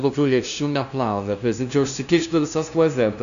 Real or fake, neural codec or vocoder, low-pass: fake; codec, 16 kHz, 0.5 kbps, FunCodec, trained on LibriTTS, 25 frames a second; 7.2 kHz